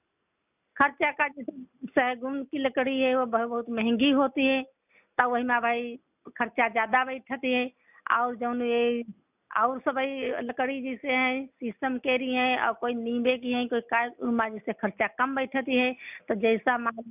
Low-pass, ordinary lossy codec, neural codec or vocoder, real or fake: 3.6 kHz; none; none; real